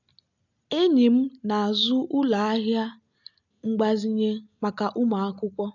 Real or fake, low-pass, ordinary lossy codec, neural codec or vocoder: real; 7.2 kHz; none; none